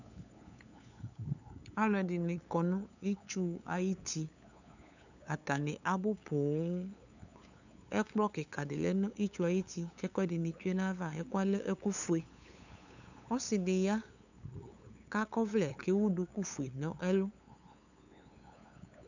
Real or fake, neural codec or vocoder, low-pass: fake; codec, 16 kHz, 8 kbps, FunCodec, trained on LibriTTS, 25 frames a second; 7.2 kHz